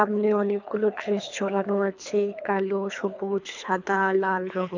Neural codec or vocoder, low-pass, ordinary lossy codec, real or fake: codec, 24 kHz, 3 kbps, HILCodec; 7.2 kHz; MP3, 64 kbps; fake